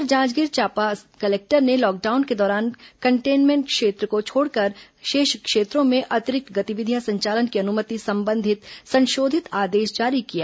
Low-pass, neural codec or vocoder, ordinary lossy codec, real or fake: none; none; none; real